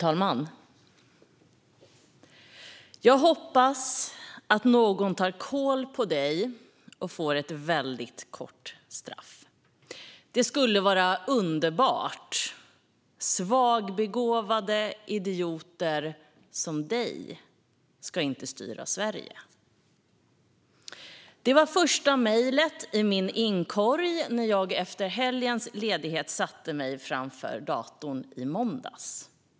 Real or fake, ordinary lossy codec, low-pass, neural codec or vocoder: real; none; none; none